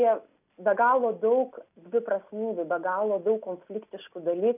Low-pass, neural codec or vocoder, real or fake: 3.6 kHz; none; real